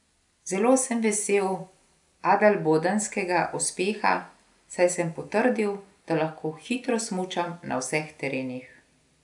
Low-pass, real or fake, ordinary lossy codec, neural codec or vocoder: 10.8 kHz; real; none; none